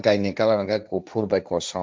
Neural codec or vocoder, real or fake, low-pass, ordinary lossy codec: codec, 16 kHz, 1.1 kbps, Voila-Tokenizer; fake; none; none